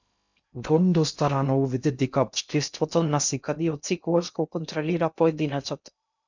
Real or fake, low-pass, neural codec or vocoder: fake; 7.2 kHz; codec, 16 kHz in and 24 kHz out, 0.6 kbps, FocalCodec, streaming, 2048 codes